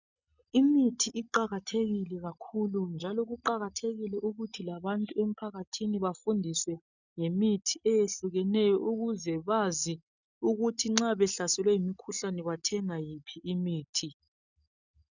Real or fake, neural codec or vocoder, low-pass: real; none; 7.2 kHz